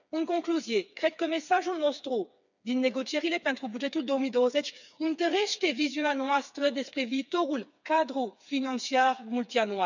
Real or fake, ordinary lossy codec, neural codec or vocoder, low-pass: fake; none; codec, 16 kHz, 4 kbps, FreqCodec, smaller model; 7.2 kHz